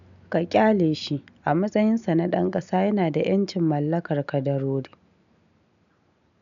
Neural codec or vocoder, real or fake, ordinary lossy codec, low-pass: none; real; none; 7.2 kHz